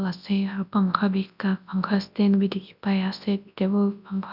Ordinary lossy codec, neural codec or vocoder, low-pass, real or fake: none; codec, 24 kHz, 0.9 kbps, WavTokenizer, large speech release; 5.4 kHz; fake